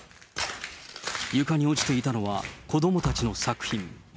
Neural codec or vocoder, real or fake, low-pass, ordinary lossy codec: none; real; none; none